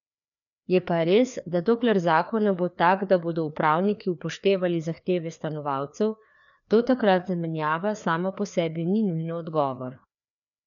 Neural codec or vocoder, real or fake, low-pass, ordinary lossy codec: codec, 16 kHz, 2 kbps, FreqCodec, larger model; fake; 7.2 kHz; none